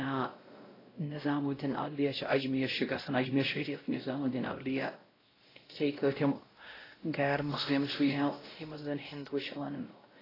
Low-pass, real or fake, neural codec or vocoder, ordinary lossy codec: 5.4 kHz; fake; codec, 16 kHz, 0.5 kbps, X-Codec, WavLM features, trained on Multilingual LibriSpeech; AAC, 24 kbps